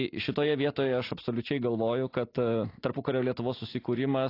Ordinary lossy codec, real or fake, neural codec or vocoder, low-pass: AAC, 32 kbps; real; none; 5.4 kHz